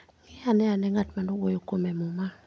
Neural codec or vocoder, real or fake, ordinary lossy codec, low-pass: none; real; none; none